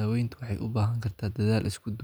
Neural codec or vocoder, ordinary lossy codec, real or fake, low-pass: none; none; real; none